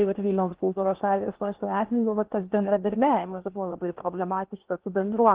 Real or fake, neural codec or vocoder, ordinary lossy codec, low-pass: fake; codec, 16 kHz in and 24 kHz out, 0.8 kbps, FocalCodec, streaming, 65536 codes; Opus, 16 kbps; 3.6 kHz